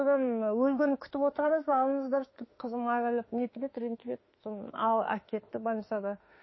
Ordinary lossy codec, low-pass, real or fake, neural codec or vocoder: MP3, 24 kbps; 7.2 kHz; fake; autoencoder, 48 kHz, 32 numbers a frame, DAC-VAE, trained on Japanese speech